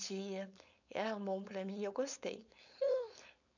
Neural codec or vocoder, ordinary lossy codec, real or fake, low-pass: codec, 16 kHz, 4.8 kbps, FACodec; none; fake; 7.2 kHz